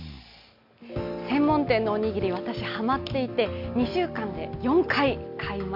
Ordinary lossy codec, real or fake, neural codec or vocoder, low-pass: AAC, 48 kbps; real; none; 5.4 kHz